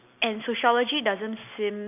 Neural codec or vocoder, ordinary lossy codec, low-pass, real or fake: none; none; 3.6 kHz; real